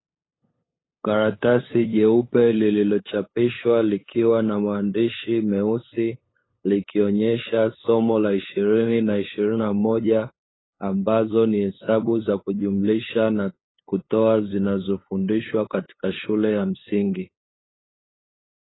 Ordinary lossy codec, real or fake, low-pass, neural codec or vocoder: AAC, 16 kbps; fake; 7.2 kHz; codec, 16 kHz, 8 kbps, FunCodec, trained on LibriTTS, 25 frames a second